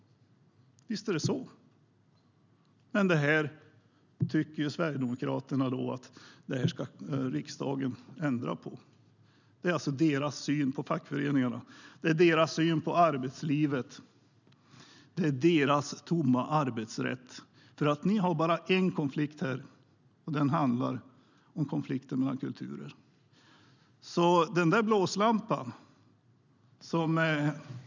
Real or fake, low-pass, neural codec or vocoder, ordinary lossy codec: real; 7.2 kHz; none; none